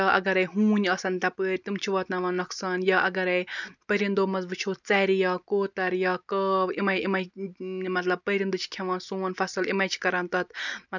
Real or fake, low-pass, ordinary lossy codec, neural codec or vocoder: real; 7.2 kHz; none; none